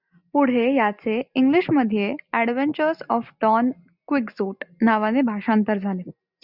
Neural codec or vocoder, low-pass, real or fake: none; 5.4 kHz; real